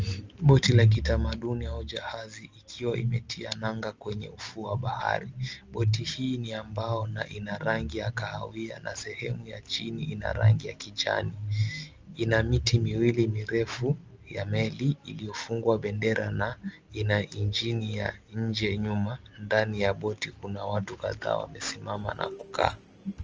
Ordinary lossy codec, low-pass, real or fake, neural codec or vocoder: Opus, 24 kbps; 7.2 kHz; real; none